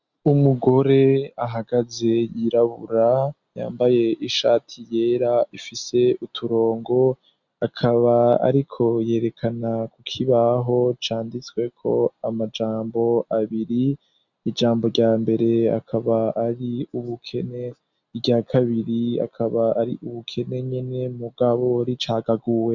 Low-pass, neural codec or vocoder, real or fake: 7.2 kHz; none; real